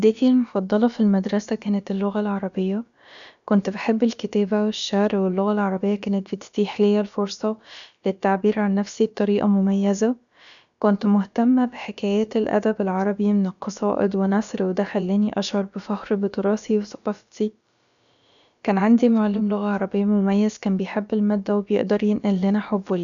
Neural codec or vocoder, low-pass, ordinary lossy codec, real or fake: codec, 16 kHz, about 1 kbps, DyCAST, with the encoder's durations; 7.2 kHz; none; fake